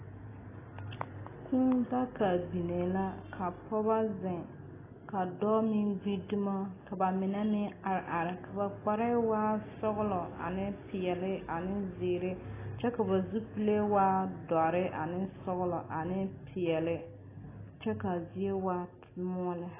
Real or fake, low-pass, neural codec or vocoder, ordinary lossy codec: real; 3.6 kHz; none; AAC, 16 kbps